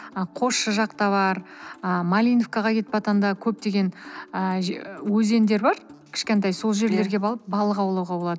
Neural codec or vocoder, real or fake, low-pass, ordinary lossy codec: none; real; none; none